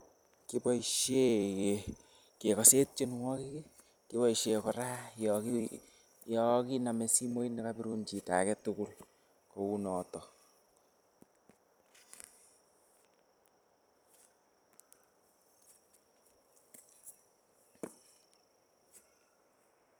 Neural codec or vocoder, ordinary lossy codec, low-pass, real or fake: vocoder, 44.1 kHz, 128 mel bands every 256 samples, BigVGAN v2; none; none; fake